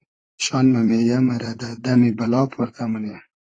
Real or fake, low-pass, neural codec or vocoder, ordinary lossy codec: fake; 9.9 kHz; vocoder, 44.1 kHz, 128 mel bands, Pupu-Vocoder; AAC, 32 kbps